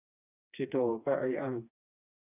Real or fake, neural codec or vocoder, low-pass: fake; codec, 16 kHz, 2 kbps, FreqCodec, smaller model; 3.6 kHz